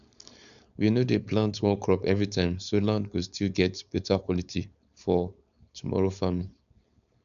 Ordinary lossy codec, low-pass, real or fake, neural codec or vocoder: none; 7.2 kHz; fake; codec, 16 kHz, 4.8 kbps, FACodec